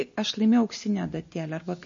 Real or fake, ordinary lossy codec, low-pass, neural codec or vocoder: real; MP3, 32 kbps; 7.2 kHz; none